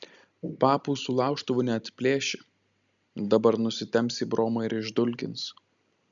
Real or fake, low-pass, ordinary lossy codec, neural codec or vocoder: real; 7.2 kHz; MP3, 96 kbps; none